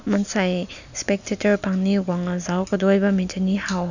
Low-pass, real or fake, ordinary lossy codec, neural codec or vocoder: 7.2 kHz; real; none; none